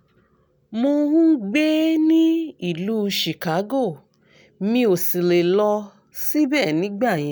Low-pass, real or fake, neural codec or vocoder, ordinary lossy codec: none; real; none; none